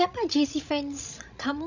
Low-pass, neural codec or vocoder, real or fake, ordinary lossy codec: 7.2 kHz; codec, 16 kHz, 16 kbps, FreqCodec, larger model; fake; none